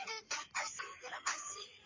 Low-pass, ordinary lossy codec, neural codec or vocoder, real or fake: 7.2 kHz; MP3, 32 kbps; codec, 16 kHz in and 24 kHz out, 2.2 kbps, FireRedTTS-2 codec; fake